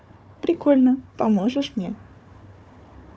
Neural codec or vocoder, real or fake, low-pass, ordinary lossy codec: codec, 16 kHz, 4 kbps, FunCodec, trained on Chinese and English, 50 frames a second; fake; none; none